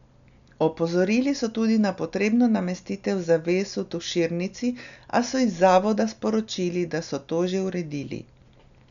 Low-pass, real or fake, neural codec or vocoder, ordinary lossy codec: 7.2 kHz; real; none; none